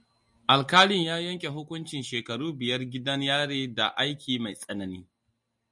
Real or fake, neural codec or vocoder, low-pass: real; none; 10.8 kHz